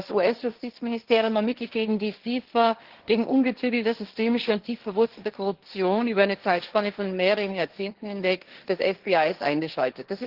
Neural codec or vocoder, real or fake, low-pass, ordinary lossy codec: codec, 16 kHz, 1.1 kbps, Voila-Tokenizer; fake; 5.4 kHz; Opus, 16 kbps